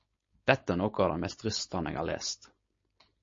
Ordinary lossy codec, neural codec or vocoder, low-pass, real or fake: MP3, 32 kbps; codec, 16 kHz, 4.8 kbps, FACodec; 7.2 kHz; fake